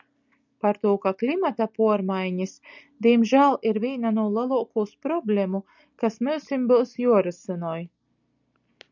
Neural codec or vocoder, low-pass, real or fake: none; 7.2 kHz; real